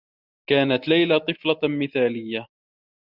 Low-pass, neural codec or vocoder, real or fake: 5.4 kHz; none; real